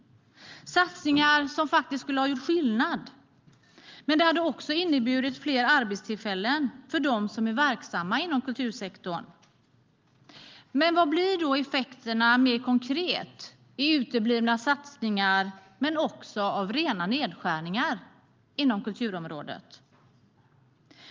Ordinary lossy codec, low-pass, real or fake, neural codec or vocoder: Opus, 32 kbps; 7.2 kHz; real; none